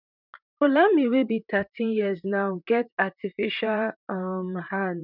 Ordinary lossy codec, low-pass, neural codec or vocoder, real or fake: none; 5.4 kHz; vocoder, 44.1 kHz, 128 mel bands, Pupu-Vocoder; fake